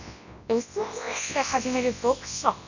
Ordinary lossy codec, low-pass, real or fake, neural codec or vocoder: none; 7.2 kHz; fake; codec, 24 kHz, 0.9 kbps, WavTokenizer, large speech release